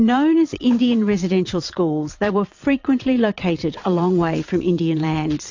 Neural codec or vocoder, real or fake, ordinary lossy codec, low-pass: vocoder, 22.05 kHz, 80 mel bands, WaveNeXt; fake; AAC, 48 kbps; 7.2 kHz